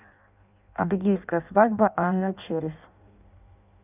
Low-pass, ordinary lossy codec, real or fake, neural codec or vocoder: 3.6 kHz; none; fake; codec, 16 kHz in and 24 kHz out, 0.6 kbps, FireRedTTS-2 codec